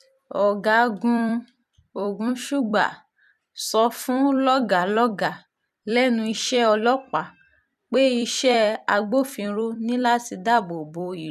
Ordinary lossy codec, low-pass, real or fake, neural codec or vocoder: none; 14.4 kHz; fake; vocoder, 44.1 kHz, 128 mel bands every 256 samples, BigVGAN v2